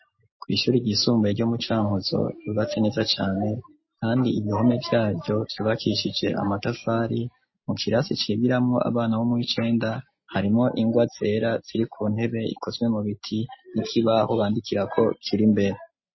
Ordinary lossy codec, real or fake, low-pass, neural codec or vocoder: MP3, 24 kbps; real; 7.2 kHz; none